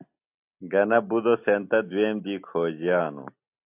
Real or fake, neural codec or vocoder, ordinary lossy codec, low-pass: real; none; AAC, 32 kbps; 3.6 kHz